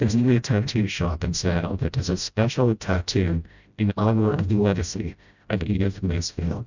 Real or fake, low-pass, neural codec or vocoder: fake; 7.2 kHz; codec, 16 kHz, 0.5 kbps, FreqCodec, smaller model